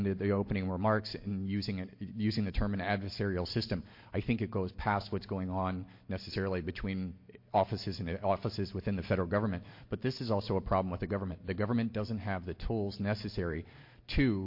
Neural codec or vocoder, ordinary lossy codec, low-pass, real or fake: none; MP3, 32 kbps; 5.4 kHz; real